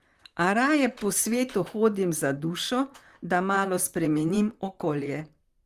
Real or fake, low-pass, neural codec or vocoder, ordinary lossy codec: fake; 14.4 kHz; vocoder, 44.1 kHz, 128 mel bands, Pupu-Vocoder; Opus, 32 kbps